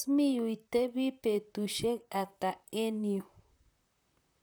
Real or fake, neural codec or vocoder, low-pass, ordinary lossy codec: fake; vocoder, 44.1 kHz, 128 mel bands, Pupu-Vocoder; none; none